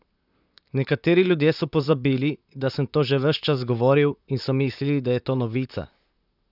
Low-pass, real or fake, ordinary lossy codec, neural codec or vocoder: 5.4 kHz; fake; none; vocoder, 44.1 kHz, 128 mel bands, Pupu-Vocoder